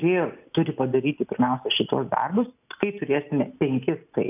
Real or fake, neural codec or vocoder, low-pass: real; none; 3.6 kHz